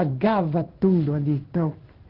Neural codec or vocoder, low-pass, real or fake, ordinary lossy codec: codec, 16 kHz in and 24 kHz out, 1 kbps, XY-Tokenizer; 5.4 kHz; fake; Opus, 16 kbps